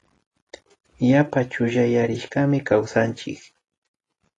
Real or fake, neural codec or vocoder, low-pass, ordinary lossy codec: real; none; 10.8 kHz; AAC, 32 kbps